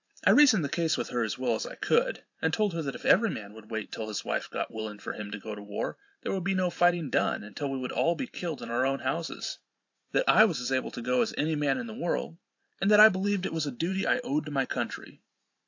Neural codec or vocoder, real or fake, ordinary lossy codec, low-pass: vocoder, 44.1 kHz, 128 mel bands every 512 samples, BigVGAN v2; fake; AAC, 48 kbps; 7.2 kHz